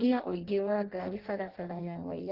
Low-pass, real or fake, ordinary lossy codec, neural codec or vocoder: 5.4 kHz; fake; Opus, 16 kbps; codec, 16 kHz in and 24 kHz out, 0.6 kbps, FireRedTTS-2 codec